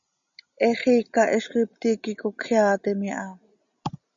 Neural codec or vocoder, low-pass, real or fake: none; 7.2 kHz; real